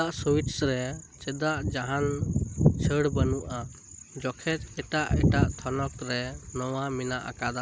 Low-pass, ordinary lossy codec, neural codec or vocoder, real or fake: none; none; none; real